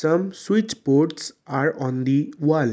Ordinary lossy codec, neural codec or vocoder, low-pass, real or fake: none; none; none; real